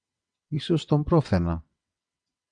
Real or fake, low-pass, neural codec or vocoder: fake; 9.9 kHz; vocoder, 22.05 kHz, 80 mel bands, WaveNeXt